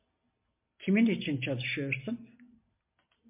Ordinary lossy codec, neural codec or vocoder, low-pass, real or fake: MP3, 32 kbps; none; 3.6 kHz; real